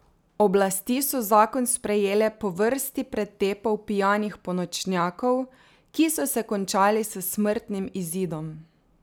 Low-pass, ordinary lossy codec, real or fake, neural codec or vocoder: none; none; real; none